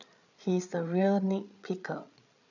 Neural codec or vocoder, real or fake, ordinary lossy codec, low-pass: codec, 16 kHz, 8 kbps, FreqCodec, larger model; fake; none; 7.2 kHz